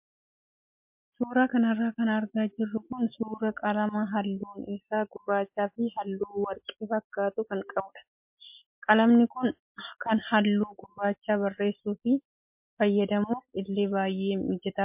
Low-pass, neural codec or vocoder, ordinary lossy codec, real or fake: 3.6 kHz; none; MP3, 32 kbps; real